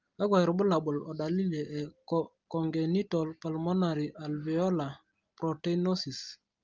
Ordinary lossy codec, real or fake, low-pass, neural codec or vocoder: Opus, 24 kbps; real; 7.2 kHz; none